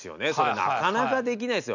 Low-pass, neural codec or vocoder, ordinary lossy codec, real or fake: 7.2 kHz; none; none; real